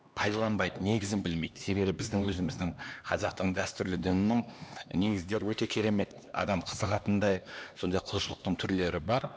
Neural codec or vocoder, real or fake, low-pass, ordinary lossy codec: codec, 16 kHz, 2 kbps, X-Codec, HuBERT features, trained on LibriSpeech; fake; none; none